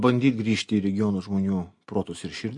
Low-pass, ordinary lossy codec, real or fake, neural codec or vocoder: 10.8 kHz; MP3, 48 kbps; real; none